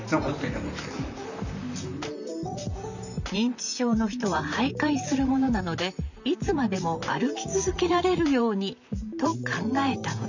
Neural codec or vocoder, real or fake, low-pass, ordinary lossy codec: vocoder, 44.1 kHz, 128 mel bands, Pupu-Vocoder; fake; 7.2 kHz; none